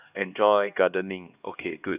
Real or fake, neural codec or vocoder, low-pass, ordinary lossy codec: fake; codec, 16 kHz, 2 kbps, X-Codec, HuBERT features, trained on LibriSpeech; 3.6 kHz; none